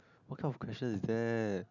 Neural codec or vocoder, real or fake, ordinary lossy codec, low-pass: none; real; none; 7.2 kHz